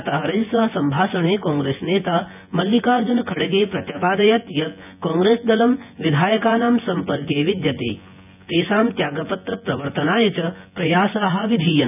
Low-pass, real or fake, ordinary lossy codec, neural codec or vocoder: 3.6 kHz; fake; none; vocoder, 24 kHz, 100 mel bands, Vocos